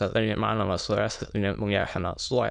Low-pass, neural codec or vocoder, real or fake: 9.9 kHz; autoencoder, 22.05 kHz, a latent of 192 numbers a frame, VITS, trained on many speakers; fake